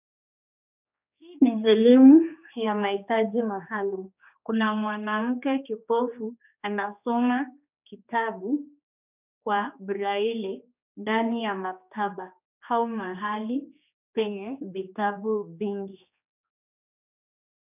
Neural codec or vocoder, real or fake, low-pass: codec, 16 kHz, 2 kbps, X-Codec, HuBERT features, trained on general audio; fake; 3.6 kHz